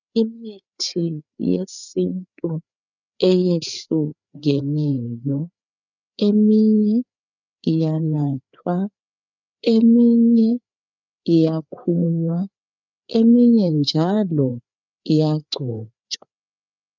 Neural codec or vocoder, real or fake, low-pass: codec, 16 kHz, 4 kbps, FreqCodec, larger model; fake; 7.2 kHz